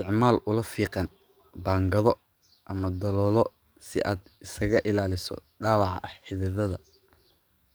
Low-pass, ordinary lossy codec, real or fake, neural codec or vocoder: none; none; fake; codec, 44.1 kHz, 7.8 kbps, DAC